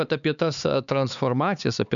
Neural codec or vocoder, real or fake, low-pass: codec, 16 kHz, 4 kbps, X-Codec, HuBERT features, trained on LibriSpeech; fake; 7.2 kHz